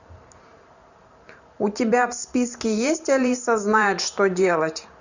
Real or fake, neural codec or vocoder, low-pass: fake; vocoder, 44.1 kHz, 128 mel bands every 512 samples, BigVGAN v2; 7.2 kHz